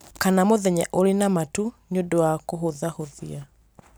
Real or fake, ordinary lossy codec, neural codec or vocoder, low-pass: real; none; none; none